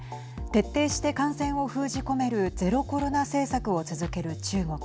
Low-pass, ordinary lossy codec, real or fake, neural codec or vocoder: none; none; real; none